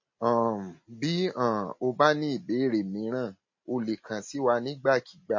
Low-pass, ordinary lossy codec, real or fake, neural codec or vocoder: 7.2 kHz; MP3, 32 kbps; real; none